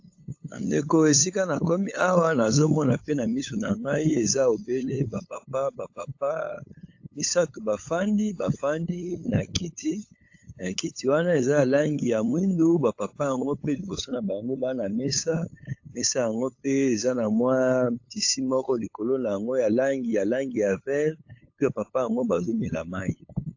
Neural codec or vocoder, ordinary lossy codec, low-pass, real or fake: codec, 16 kHz, 8 kbps, FunCodec, trained on LibriTTS, 25 frames a second; AAC, 48 kbps; 7.2 kHz; fake